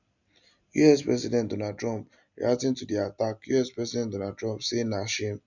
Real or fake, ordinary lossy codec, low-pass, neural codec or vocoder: real; none; 7.2 kHz; none